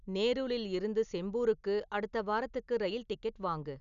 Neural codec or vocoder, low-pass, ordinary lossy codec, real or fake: none; 7.2 kHz; none; real